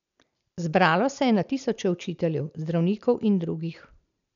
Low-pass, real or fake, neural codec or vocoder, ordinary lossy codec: 7.2 kHz; real; none; none